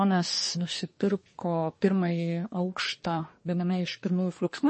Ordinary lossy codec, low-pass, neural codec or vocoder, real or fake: MP3, 32 kbps; 10.8 kHz; codec, 24 kHz, 1 kbps, SNAC; fake